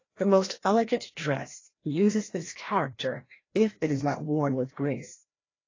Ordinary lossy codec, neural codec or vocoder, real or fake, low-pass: AAC, 32 kbps; codec, 16 kHz, 1 kbps, FreqCodec, larger model; fake; 7.2 kHz